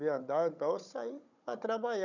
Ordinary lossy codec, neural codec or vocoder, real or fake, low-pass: none; codec, 16 kHz, 16 kbps, FunCodec, trained on Chinese and English, 50 frames a second; fake; 7.2 kHz